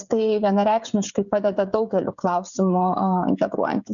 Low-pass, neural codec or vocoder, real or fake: 7.2 kHz; codec, 16 kHz, 16 kbps, FreqCodec, smaller model; fake